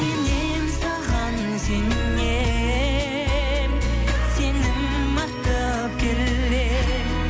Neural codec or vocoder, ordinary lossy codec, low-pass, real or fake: none; none; none; real